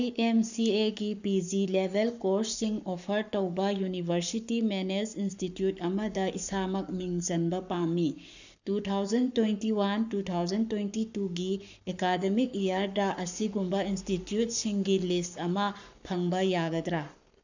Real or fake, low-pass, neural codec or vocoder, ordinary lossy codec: fake; 7.2 kHz; codec, 44.1 kHz, 7.8 kbps, Pupu-Codec; none